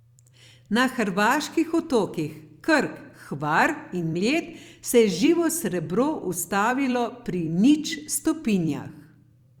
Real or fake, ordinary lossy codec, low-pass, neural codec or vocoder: fake; Opus, 64 kbps; 19.8 kHz; vocoder, 44.1 kHz, 128 mel bands every 256 samples, BigVGAN v2